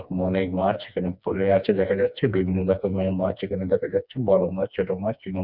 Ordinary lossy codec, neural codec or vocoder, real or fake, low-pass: none; codec, 16 kHz, 2 kbps, FreqCodec, smaller model; fake; 5.4 kHz